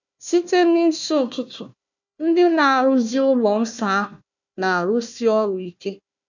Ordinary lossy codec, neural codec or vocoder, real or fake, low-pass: none; codec, 16 kHz, 1 kbps, FunCodec, trained on Chinese and English, 50 frames a second; fake; 7.2 kHz